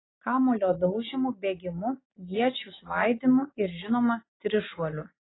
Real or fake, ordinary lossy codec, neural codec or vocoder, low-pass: real; AAC, 16 kbps; none; 7.2 kHz